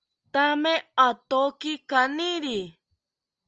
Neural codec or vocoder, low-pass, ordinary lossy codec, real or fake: none; 7.2 kHz; Opus, 24 kbps; real